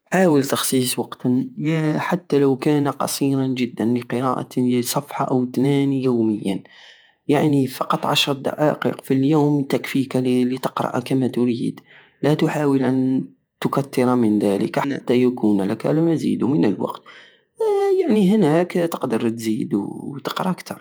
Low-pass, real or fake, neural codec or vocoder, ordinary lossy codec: none; fake; vocoder, 48 kHz, 128 mel bands, Vocos; none